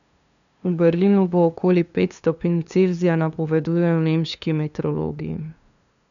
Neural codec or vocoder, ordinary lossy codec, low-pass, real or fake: codec, 16 kHz, 2 kbps, FunCodec, trained on LibriTTS, 25 frames a second; none; 7.2 kHz; fake